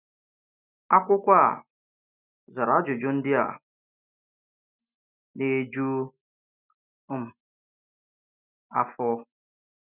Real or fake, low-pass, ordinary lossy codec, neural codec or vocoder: real; 3.6 kHz; none; none